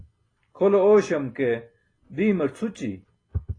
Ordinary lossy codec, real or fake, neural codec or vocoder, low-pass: AAC, 32 kbps; real; none; 9.9 kHz